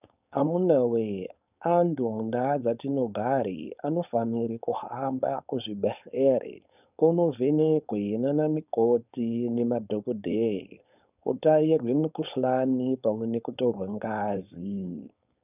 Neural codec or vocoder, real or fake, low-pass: codec, 16 kHz, 4.8 kbps, FACodec; fake; 3.6 kHz